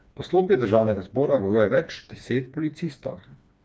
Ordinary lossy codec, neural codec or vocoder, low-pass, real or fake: none; codec, 16 kHz, 2 kbps, FreqCodec, smaller model; none; fake